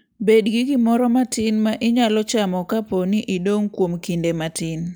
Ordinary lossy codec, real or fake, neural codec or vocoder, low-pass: none; real; none; none